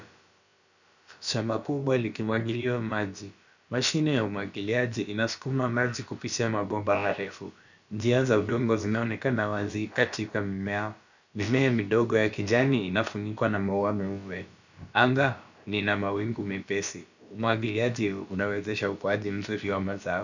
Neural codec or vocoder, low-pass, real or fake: codec, 16 kHz, about 1 kbps, DyCAST, with the encoder's durations; 7.2 kHz; fake